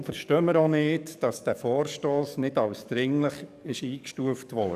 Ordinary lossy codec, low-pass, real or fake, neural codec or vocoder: none; 14.4 kHz; fake; codec, 44.1 kHz, 7.8 kbps, DAC